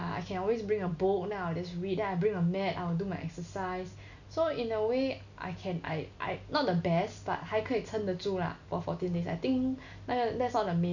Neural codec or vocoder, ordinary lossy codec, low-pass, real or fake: none; none; 7.2 kHz; real